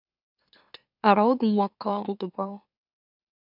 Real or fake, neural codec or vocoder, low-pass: fake; autoencoder, 44.1 kHz, a latent of 192 numbers a frame, MeloTTS; 5.4 kHz